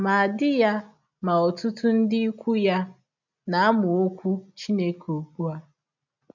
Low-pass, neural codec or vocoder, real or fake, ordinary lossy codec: 7.2 kHz; none; real; none